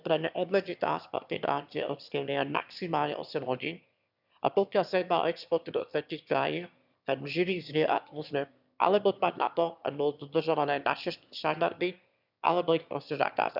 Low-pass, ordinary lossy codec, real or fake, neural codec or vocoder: 5.4 kHz; none; fake; autoencoder, 22.05 kHz, a latent of 192 numbers a frame, VITS, trained on one speaker